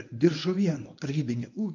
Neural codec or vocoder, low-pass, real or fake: codec, 24 kHz, 0.9 kbps, WavTokenizer, medium speech release version 1; 7.2 kHz; fake